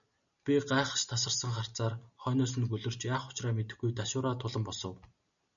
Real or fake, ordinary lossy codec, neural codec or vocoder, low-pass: real; MP3, 96 kbps; none; 7.2 kHz